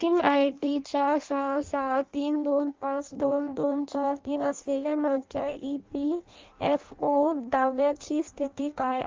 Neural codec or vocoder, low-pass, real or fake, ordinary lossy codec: codec, 16 kHz in and 24 kHz out, 0.6 kbps, FireRedTTS-2 codec; 7.2 kHz; fake; Opus, 32 kbps